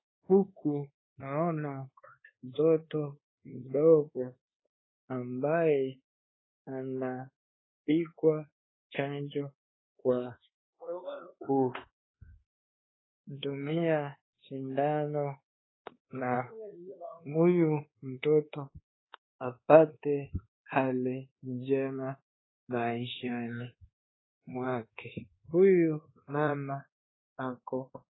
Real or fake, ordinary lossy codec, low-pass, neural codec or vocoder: fake; AAC, 16 kbps; 7.2 kHz; codec, 24 kHz, 1.2 kbps, DualCodec